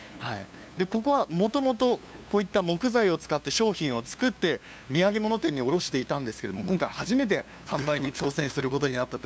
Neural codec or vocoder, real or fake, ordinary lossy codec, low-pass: codec, 16 kHz, 2 kbps, FunCodec, trained on LibriTTS, 25 frames a second; fake; none; none